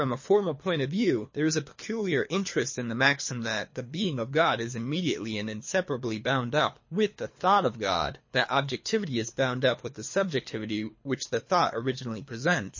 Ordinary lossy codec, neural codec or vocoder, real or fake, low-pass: MP3, 32 kbps; codec, 24 kHz, 3 kbps, HILCodec; fake; 7.2 kHz